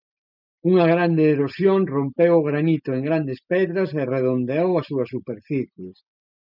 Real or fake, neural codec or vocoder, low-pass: real; none; 5.4 kHz